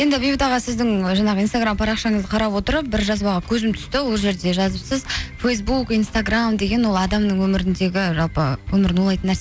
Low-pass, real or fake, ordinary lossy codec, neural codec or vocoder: none; real; none; none